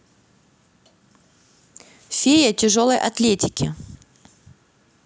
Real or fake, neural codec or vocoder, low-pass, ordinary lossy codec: real; none; none; none